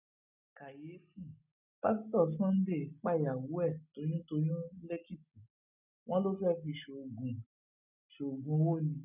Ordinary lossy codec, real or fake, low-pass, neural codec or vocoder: none; real; 3.6 kHz; none